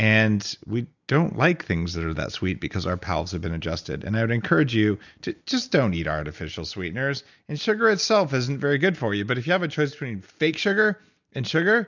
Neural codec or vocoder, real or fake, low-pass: none; real; 7.2 kHz